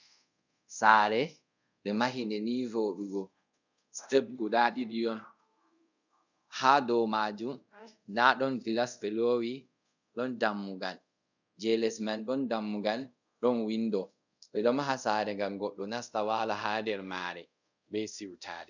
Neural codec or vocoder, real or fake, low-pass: codec, 24 kHz, 0.5 kbps, DualCodec; fake; 7.2 kHz